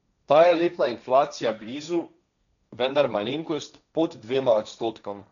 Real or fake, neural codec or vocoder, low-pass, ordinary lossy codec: fake; codec, 16 kHz, 1.1 kbps, Voila-Tokenizer; 7.2 kHz; none